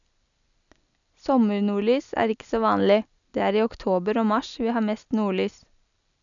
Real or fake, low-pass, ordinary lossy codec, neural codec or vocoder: real; 7.2 kHz; none; none